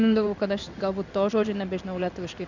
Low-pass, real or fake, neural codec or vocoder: 7.2 kHz; fake; codec, 16 kHz in and 24 kHz out, 1 kbps, XY-Tokenizer